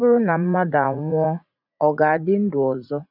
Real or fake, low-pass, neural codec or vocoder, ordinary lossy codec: fake; 5.4 kHz; vocoder, 44.1 kHz, 80 mel bands, Vocos; none